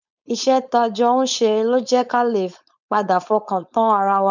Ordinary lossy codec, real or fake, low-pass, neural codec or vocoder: none; fake; 7.2 kHz; codec, 16 kHz, 4.8 kbps, FACodec